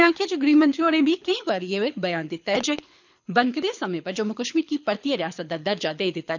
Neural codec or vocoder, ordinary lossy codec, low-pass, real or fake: codec, 24 kHz, 6 kbps, HILCodec; none; 7.2 kHz; fake